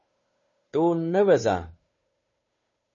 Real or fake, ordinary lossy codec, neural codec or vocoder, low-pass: fake; MP3, 32 kbps; codec, 16 kHz, 2 kbps, FunCodec, trained on Chinese and English, 25 frames a second; 7.2 kHz